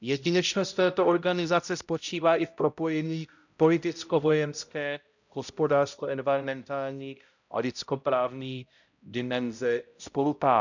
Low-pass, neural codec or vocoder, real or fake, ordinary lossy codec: 7.2 kHz; codec, 16 kHz, 0.5 kbps, X-Codec, HuBERT features, trained on balanced general audio; fake; none